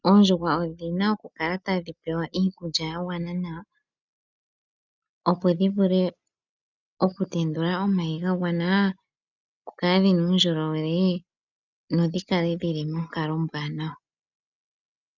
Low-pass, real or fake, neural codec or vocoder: 7.2 kHz; real; none